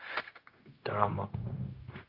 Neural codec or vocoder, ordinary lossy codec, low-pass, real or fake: codec, 16 kHz, 0.4 kbps, LongCat-Audio-Codec; Opus, 32 kbps; 5.4 kHz; fake